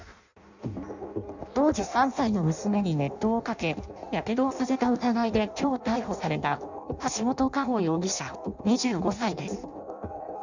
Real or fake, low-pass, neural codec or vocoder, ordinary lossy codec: fake; 7.2 kHz; codec, 16 kHz in and 24 kHz out, 0.6 kbps, FireRedTTS-2 codec; none